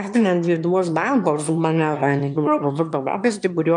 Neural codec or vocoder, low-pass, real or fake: autoencoder, 22.05 kHz, a latent of 192 numbers a frame, VITS, trained on one speaker; 9.9 kHz; fake